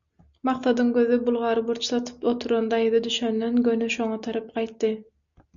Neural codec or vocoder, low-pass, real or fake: none; 7.2 kHz; real